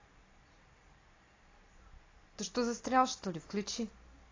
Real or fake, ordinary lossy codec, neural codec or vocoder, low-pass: real; AAC, 32 kbps; none; 7.2 kHz